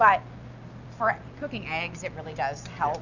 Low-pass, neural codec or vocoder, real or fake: 7.2 kHz; none; real